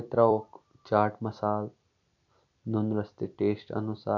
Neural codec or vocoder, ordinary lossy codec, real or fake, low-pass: vocoder, 44.1 kHz, 128 mel bands every 256 samples, BigVGAN v2; none; fake; 7.2 kHz